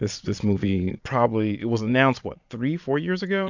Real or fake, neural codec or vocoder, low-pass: fake; vocoder, 22.05 kHz, 80 mel bands, Vocos; 7.2 kHz